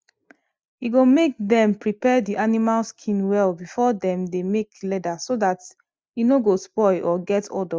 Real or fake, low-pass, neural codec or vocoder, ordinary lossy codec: real; none; none; none